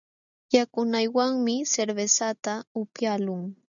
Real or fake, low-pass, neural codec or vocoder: real; 7.2 kHz; none